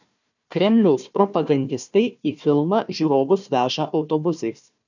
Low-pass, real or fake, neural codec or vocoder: 7.2 kHz; fake; codec, 16 kHz, 1 kbps, FunCodec, trained on Chinese and English, 50 frames a second